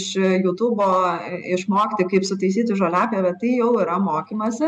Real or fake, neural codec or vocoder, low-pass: real; none; 10.8 kHz